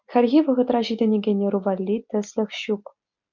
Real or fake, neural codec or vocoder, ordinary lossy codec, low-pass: real; none; MP3, 64 kbps; 7.2 kHz